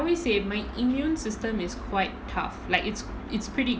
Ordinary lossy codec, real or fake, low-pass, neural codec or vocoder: none; real; none; none